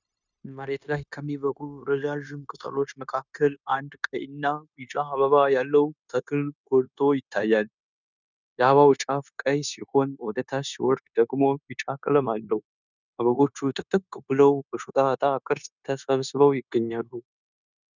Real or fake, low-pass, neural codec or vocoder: fake; 7.2 kHz; codec, 16 kHz, 0.9 kbps, LongCat-Audio-Codec